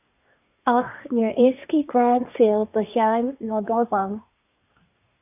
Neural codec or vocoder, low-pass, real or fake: codec, 24 kHz, 1 kbps, SNAC; 3.6 kHz; fake